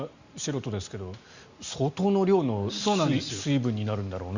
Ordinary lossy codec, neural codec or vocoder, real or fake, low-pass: Opus, 64 kbps; none; real; 7.2 kHz